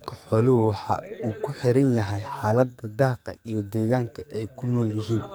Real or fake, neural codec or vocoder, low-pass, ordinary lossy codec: fake; codec, 44.1 kHz, 2.6 kbps, SNAC; none; none